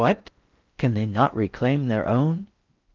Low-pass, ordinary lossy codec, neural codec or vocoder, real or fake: 7.2 kHz; Opus, 24 kbps; codec, 16 kHz in and 24 kHz out, 0.6 kbps, FocalCodec, streaming, 4096 codes; fake